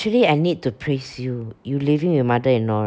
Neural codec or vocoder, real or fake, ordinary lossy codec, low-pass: none; real; none; none